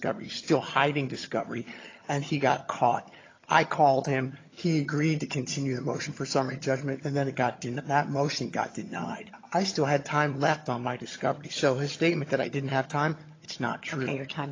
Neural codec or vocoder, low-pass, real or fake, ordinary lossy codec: vocoder, 22.05 kHz, 80 mel bands, HiFi-GAN; 7.2 kHz; fake; AAC, 32 kbps